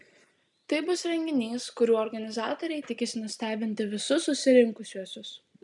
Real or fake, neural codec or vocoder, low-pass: real; none; 10.8 kHz